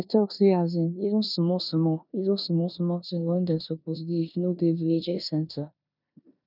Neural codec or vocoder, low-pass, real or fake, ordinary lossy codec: codec, 16 kHz in and 24 kHz out, 0.9 kbps, LongCat-Audio-Codec, four codebook decoder; 5.4 kHz; fake; none